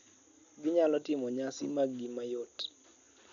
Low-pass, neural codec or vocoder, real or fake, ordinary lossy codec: 7.2 kHz; none; real; none